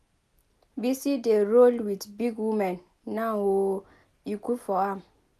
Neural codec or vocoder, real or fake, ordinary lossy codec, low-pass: none; real; none; 14.4 kHz